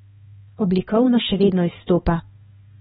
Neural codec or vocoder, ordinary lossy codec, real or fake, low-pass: codec, 16 kHz, 2 kbps, X-Codec, HuBERT features, trained on balanced general audio; AAC, 16 kbps; fake; 7.2 kHz